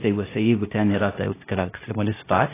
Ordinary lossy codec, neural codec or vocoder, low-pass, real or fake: AAC, 16 kbps; codec, 16 kHz, 0.8 kbps, ZipCodec; 3.6 kHz; fake